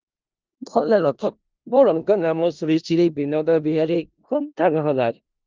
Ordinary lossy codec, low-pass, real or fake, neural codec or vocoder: Opus, 24 kbps; 7.2 kHz; fake; codec, 16 kHz in and 24 kHz out, 0.4 kbps, LongCat-Audio-Codec, four codebook decoder